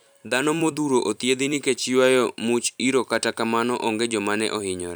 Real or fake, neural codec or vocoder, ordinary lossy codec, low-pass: fake; vocoder, 44.1 kHz, 128 mel bands every 256 samples, BigVGAN v2; none; none